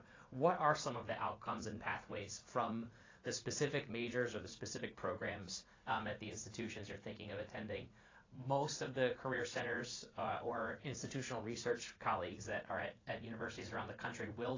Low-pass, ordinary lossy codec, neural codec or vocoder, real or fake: 7.2 kHz; AAC, 32 kbps; vocoder, 44.1 kHz, 80 mel bands, Vocos; fake